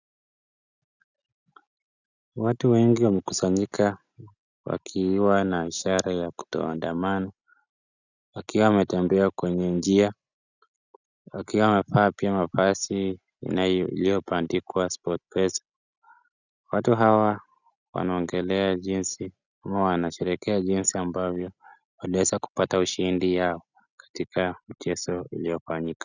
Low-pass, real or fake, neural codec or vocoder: 7.2 kHz; real; none